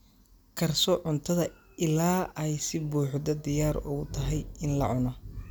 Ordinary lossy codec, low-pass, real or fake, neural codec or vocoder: none; none; real; none